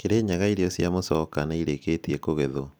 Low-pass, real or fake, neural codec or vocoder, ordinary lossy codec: none; real; none; none